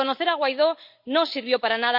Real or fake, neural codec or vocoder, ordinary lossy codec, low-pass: real; none; none; 5.4 kHz